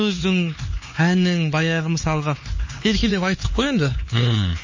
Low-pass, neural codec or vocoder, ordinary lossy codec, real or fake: 7.2 kHz; codec, 16 kHz, 4 kbps, X-Codec, HuBERT features, trained on LibriSpeech; MP3, 32 kbps; fake